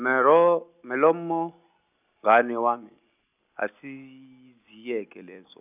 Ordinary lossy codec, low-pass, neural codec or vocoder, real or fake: none; 3.6 kHz; none; real